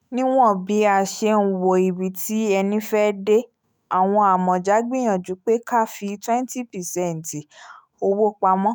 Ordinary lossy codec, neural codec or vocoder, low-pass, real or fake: none; autoencoder, 48 kHz, 128 numbers a frame, DAC-VAE, trained on Japanese speech; none; fake